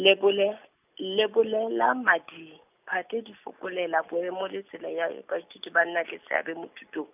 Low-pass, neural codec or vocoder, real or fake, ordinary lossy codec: 3.6 kHz; none; real; none